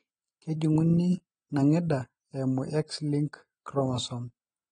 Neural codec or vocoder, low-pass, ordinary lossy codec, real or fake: none; 9.9 kHz; AAC, 32 kbps; real